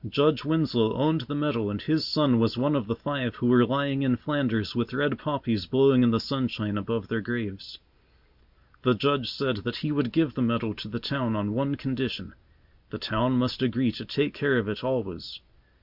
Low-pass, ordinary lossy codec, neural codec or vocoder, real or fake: 5.4 kHz; Opus, 64 kbps; none; real